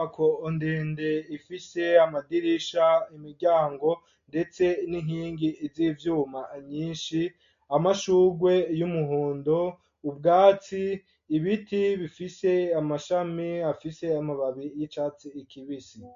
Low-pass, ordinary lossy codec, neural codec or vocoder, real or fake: 7.2 kHz; MP3, 48 kbps; none; real